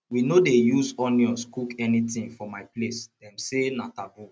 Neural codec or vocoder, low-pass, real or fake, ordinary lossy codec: none; none; real; none